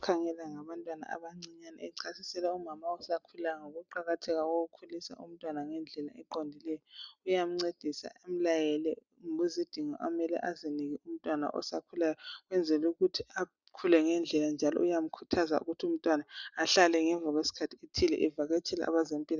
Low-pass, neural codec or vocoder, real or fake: 7.2 kHz; none; real